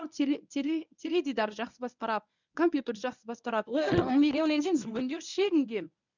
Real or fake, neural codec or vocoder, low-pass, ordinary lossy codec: fake; codec, 24 kHz, 0.9 kbps, WavTokenizer, medium speech release version 1; 7.2 kHz; none